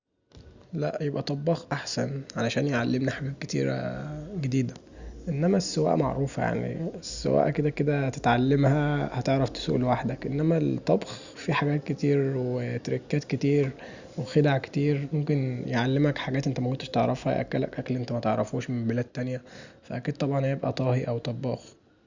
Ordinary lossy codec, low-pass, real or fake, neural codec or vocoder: Opus, 64 kbps; 7.2 kHz; real; none